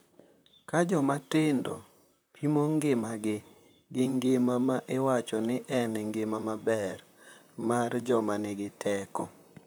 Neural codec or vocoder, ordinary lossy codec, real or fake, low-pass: vocoder, 44.1 kHz, 128 mel bands, Pupu-Vocoder; none; fake; none